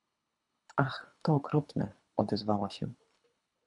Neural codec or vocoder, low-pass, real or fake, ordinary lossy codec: codec, 24 kHz, 3 kbps, HILCodec; 10.8 kHz; fake; MP3, 96 kbps